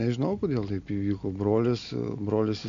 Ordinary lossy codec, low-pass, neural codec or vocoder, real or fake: MP3, 64 kbps; 7.2 kHz; none; real